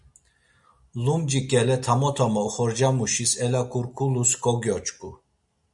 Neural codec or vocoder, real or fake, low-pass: none; real; 10.8 kHz